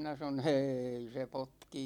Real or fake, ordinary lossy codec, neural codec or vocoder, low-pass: real; none; none; 19.8 kHz